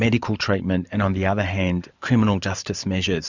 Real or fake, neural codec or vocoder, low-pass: fake; vocoder, 44.1 kHz, 128 mel bands every 512 samples, BigVGAN v2; 7.2 kHz